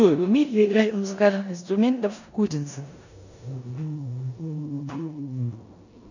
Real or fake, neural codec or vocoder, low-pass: fake; codec, 16 kHz in and 24 kHz out, 0.9 kbps, LongCat-Audio-Codec, four codebook decoder; 7.2 kHz